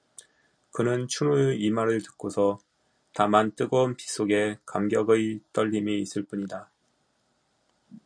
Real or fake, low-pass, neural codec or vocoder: real; 9.9 kHz; none